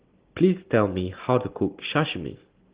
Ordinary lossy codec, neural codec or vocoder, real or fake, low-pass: Opus, 16 kbps; none; real; 3.6 kHz